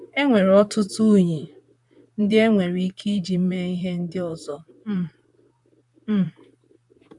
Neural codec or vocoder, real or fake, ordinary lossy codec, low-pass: vocoder, 44.1 kHz, 128 mel bands, Pupu-Vocoder; fake; none; 10.8 kHz